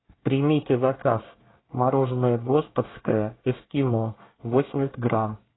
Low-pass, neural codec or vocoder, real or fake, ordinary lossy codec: 7.2 kHz; codec, 24 kHz, 1 kbps, SNAC; fake; AAC, 16 kbps